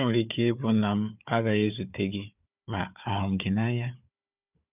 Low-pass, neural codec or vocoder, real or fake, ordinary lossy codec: 3.6 kHz; codec, 16 kHz, 4 kbps, FunCodec, trained on Chinese and English, 50 frames a second; fake; none